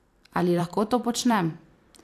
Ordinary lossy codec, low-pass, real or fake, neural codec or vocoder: AAC, 64 kbps; 14.4 kHz; fake; vocoder, 44.1 kHz, 128 mel bands every 256 samples, BigVGAN v2